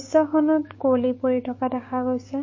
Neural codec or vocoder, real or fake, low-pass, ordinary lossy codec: codec, 16 kHz, 6 kbps, DAC; fake; 7.2 kHz; MP3, 32 kbps